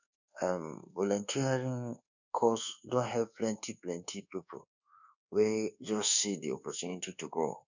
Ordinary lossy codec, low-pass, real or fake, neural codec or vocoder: none; 7.2 kHz; fake; codec, 24 kHz, 1.2 kbps, DualCodec